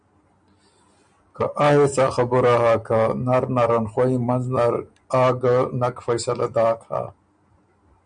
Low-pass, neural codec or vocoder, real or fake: 9.9 kHz; none; real